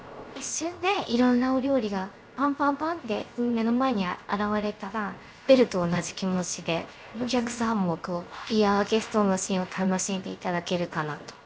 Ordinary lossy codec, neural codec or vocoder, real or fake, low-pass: none; codec, 16 kHz, 0.7 kbps, FocalCodec; fake; none